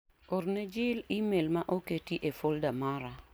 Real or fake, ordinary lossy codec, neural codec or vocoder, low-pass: real; none; none; none